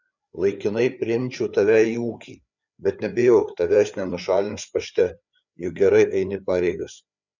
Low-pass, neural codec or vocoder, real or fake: 7.2 kHz; codec, 16 kHz, 4 kbps, FreqCodec, larger model; fake